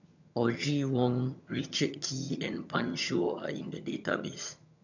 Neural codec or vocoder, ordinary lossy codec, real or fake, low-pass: vocoder, 22.05 kHz, 80 mel bands, HiFi-GAN; none; fake; 7.2 kHz